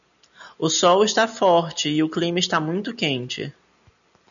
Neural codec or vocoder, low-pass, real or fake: none; 7.2 kHz; real